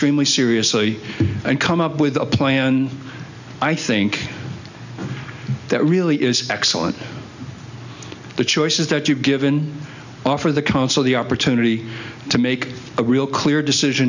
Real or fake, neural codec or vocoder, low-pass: real; none; 7.2 kHz